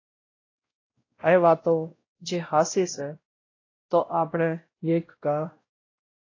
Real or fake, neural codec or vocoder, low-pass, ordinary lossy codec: fake; codec, 16 kHz, 0.5 kbps, X-Codec, WavLM features, trained on Multilingual LibriSpeech; 7.2 kHz; AAC, 32 kbps